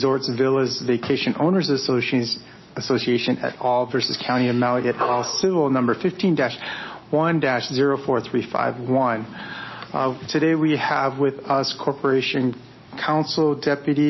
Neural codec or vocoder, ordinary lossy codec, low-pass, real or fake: none; MP3, 24 kbps; 7.2 kHz; real